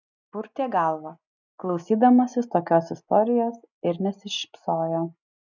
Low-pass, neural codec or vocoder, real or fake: 7.2 kHz; none; real